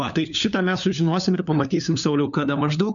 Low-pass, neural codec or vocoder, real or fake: 7.2 kHz; codec, 16 kHz, 4 kbps, FunCodec, trained on LibriTTS, 50 frames a second; fake